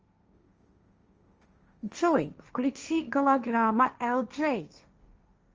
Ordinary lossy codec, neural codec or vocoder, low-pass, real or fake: Opus, 24 kbps; codec, 16 kHz, 1.1 kbps, Voila-Tokenizer; 7.2 kHz; fake